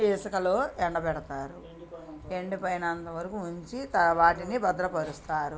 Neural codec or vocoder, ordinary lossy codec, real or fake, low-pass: none; none; real; none